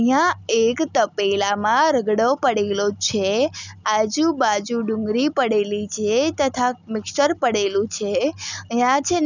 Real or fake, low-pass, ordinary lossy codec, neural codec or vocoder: real; 7.2 kHz; none; none